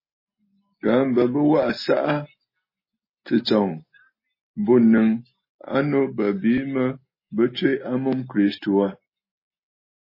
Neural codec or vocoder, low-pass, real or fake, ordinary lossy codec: none; 5.4 kHz; real; MP3, 24 kbps